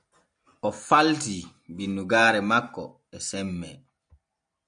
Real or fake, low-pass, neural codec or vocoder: real; 9.9 kHz; none